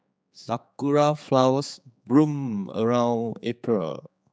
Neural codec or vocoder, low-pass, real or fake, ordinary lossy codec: codec, 16 kHz, 4 kbps, X-Codec, HuBERT features, trained on general audio; none; fake; none